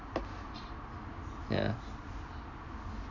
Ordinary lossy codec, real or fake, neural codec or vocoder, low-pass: none; real; none; 7.2 kHz